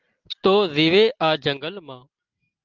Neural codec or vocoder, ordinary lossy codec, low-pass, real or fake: none; Opus, 24 kbps; 7.2 kHz; real